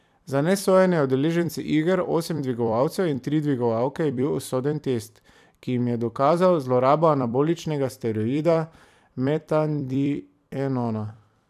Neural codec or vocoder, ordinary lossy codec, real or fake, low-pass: vocoder, 44.1 kHz, 128 mel bands every 256 samples, BigVGAN v2; none; fake; 14.4 kHz